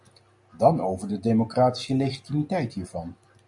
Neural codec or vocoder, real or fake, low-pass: none; real; 10.8 kHz